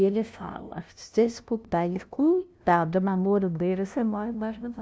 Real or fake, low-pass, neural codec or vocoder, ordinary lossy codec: fake; none; codec, 16 kHz, 0.5 kbps, FunCodec, trained on LibriTTS, 25 frames a second; none